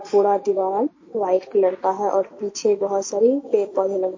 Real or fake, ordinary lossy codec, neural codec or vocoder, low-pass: real; MP3, 32 kbps; none; 7.2 kHz